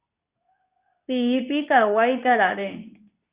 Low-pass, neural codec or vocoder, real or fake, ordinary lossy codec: 3.6 kHz; codec, 24 kHz, 0.9 kbps, WavTokenizer, medium speech release version 2; fake; Opus, 64 kbps